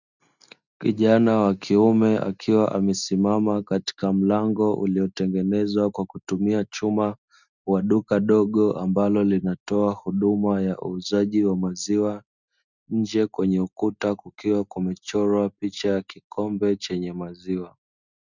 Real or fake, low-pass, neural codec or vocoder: real; 7.2 kHz; none